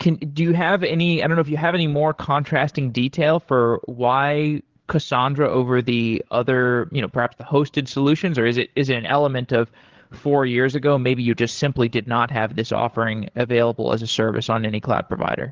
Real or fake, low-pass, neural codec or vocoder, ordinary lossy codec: fake; 7.2 kHz; codec, 16 kHz, 8 kbps, FreqCodec, larger model; Opus, 16 kbps